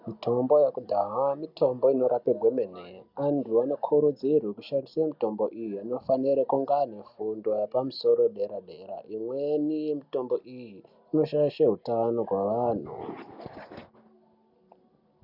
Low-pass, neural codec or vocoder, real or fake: 5.4 kHz; none; real